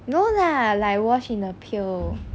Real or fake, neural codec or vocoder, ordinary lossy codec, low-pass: real; none; none; none